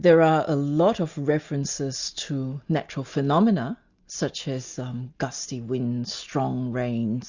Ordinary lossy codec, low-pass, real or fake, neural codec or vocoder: Opus, 64 kbps; 7.2 kHz; real; none